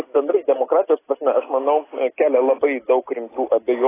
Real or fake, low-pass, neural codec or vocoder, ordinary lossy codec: real; 3.6 kHz; none; AAC, 16 kbps